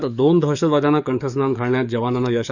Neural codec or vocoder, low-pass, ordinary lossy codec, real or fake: codec, 44.1 kHz, 7.8 kbps, DAC; 7.2 kHz; none; fake